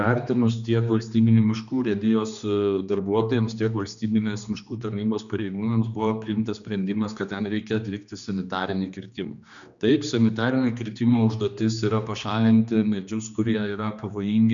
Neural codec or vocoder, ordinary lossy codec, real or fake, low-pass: codec, 16 kHz, 2 kbps, X-Codec, HuBERT features, trained on general audio; MP3, 96 kbps; fake; 7.2 kHz